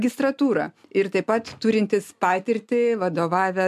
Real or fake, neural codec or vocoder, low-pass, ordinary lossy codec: real; none; 14.4 kHz; MP3, 96 kbps